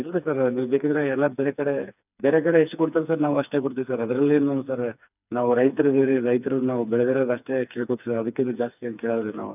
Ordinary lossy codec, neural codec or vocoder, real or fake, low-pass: none; codec, 16 kHz, 4 kbps, FreqCodec, smaller model; fake; 3.6 kHz